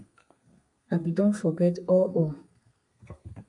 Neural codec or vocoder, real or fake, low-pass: codec, 32 kHz, 1.9 kbps, SNAC; fake; 10.8 kHz